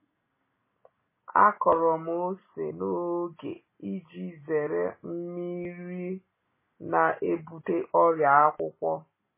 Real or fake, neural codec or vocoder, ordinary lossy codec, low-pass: real; none; MP3, 16 kbps; 3.6 kHz